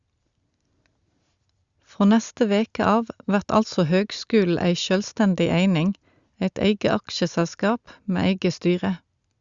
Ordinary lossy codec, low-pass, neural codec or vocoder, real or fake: Opus, 64 kbps; 7.2 kHz; none; real